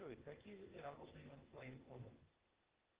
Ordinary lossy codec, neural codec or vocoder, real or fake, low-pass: Opus, 16 kbps; codec, 16 kHz, 0.8 kbps, ZipCodec; fake; 3.6 kHz